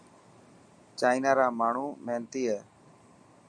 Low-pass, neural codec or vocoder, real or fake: 9.9 kHz; none; real